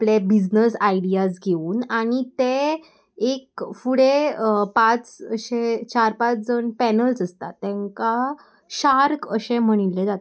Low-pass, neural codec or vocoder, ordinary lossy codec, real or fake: none; none; none; real